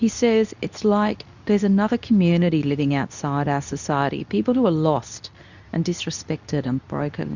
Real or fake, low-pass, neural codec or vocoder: fake; 7.2 kHz; codec, 24 kHz, 0.9 kbps, WavTokenizer, medium speech release version 2